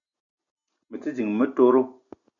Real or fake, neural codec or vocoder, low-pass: real; none; 7.2 kHz